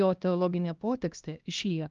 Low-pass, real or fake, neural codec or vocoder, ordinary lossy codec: 7.2 kHz; fake; codec, 16 kHz, 1 kbps, X-Codec, HuBERT features, trained on LibriSpeech; Opus, 24 kbps